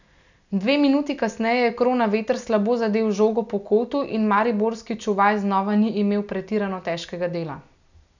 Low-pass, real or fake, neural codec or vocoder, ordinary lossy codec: 7.2 kHz; real; none; none